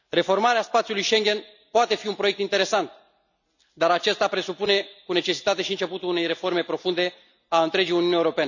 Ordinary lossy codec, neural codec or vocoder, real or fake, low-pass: none; none; real; 7.2 kHz